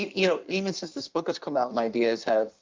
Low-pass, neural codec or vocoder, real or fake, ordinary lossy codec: 7.2 kHz; codec, 16 kHz in and 24 kHz out, 1.1 kbps, FireRedTTS-2 codec; fake; Opus, 24 kbps